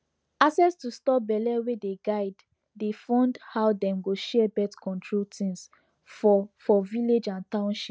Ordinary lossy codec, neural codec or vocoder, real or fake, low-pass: none; none; real; none